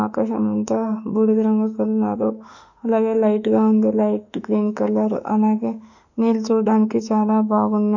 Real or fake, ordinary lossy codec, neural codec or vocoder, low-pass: fake; none; autoencoder, 48 kHz, 32 numbers a frame, DAC-VAE, trained on Japanese speech; 7.2 kHz